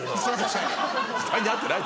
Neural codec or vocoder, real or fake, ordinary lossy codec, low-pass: none; real; none; none